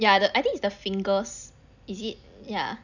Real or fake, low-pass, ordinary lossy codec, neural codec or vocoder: real; 7.2 kHz; none; none